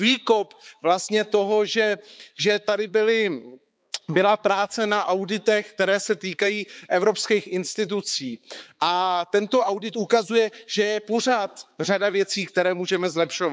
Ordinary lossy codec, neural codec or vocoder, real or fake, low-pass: none; codec, 16 kHz, 4 kbps, X-Codec, HuBERT features, trained on balanced general audio; fake; none